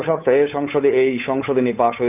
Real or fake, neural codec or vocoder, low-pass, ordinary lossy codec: fake; codec, 16 kHz, 8 kbps, FunCodec, trained on Chinese and English, 25 frames a second; 3.6 kHz; none